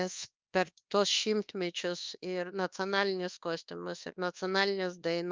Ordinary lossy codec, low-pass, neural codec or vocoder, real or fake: Opus, 24 kbps; 7.2 kHz; codec, 24 kHz, 1.2 kbps, DualCodec; fake